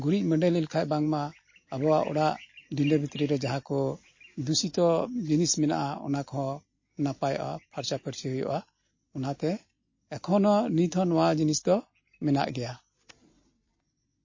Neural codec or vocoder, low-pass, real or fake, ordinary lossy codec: none; 7.2 kHz; real; MP3, 32 kbps